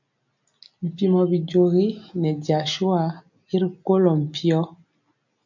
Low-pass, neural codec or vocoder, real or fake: 7.2 kHz; none; real